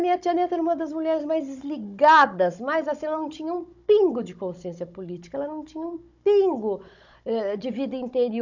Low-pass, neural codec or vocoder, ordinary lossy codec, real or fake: 7.2 kHz; codec, 16 kHz, 16 kbps, FunCodec, trained on Chinese and English, 50 frames a second; none; fake